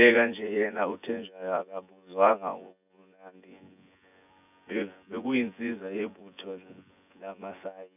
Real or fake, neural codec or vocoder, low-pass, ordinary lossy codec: fake; vocoder, 24 kHz, 100 mel bands, Vocos; 3.6 kHz; none